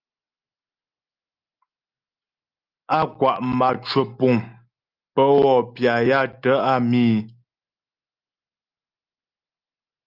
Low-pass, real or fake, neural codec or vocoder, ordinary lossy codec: 5.4 kHz; real; none; Opus, 32 kbps